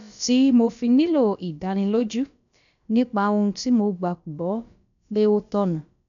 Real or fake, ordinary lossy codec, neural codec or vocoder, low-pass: fake; none; codec, 16 kHz, about 1 kbps, DyCAST, with the encoder's durations; 7.2 kHz